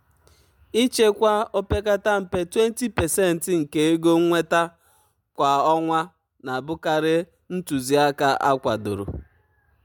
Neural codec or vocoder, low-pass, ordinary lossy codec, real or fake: none; 19.8 kHz; MP3, 96 kbps; real